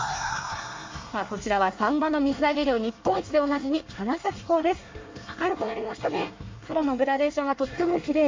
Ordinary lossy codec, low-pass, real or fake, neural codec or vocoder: MP3, 48 kbps; 7.2 kHz; fake; codec, 24 kHz, 1 kbps, SNAC